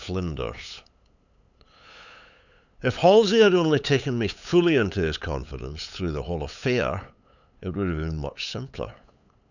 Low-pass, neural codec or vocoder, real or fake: 7.2 kHz; codec, 16 kHz, 8 kbps, FunCodec, trained on LibriTTS, 25 frames a second; fake